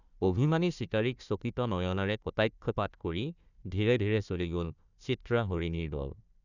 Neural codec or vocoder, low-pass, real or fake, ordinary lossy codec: codec, 16 kHz, 1 kbps, FunCodec, trained on Chinese and English, 50 frames a second; 7.2 kHz; fake; none